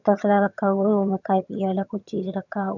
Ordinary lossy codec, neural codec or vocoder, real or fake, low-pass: none; vocoder, 22.05 kHz, 80 mel bands, HiFi-GAN; fake; 7.2 kHz